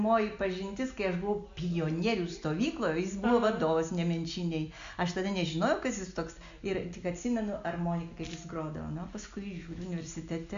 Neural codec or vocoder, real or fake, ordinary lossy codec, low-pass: none; real; MP3, 64 kbps; 7.2 kHz